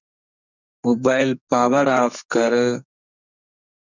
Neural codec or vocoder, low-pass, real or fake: codec, 44.1 kHz, 2.6 kbps, SNAC; 7.2 kHz; fake